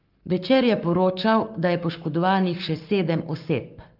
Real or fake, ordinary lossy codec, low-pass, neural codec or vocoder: fake; Opus, 32 kbps; 5.4 kHz; codec, 44.1 kHz, 7.8 kbps, Pupu-Codec